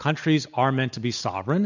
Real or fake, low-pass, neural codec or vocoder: real; 7.2 kHz; none